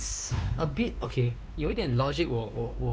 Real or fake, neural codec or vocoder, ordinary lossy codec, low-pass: fake; codec, 16 kHz, 2 kbps, X-Codec, WavLM features, trained on Multilingual LibriSpeech; none; none